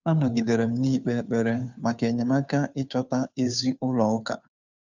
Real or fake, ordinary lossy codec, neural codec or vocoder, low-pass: fake; none; codec, 16 kHz, 2 kbps, FunCodec, trained on Chinese and English, 25 frames a second; 7.2 kHz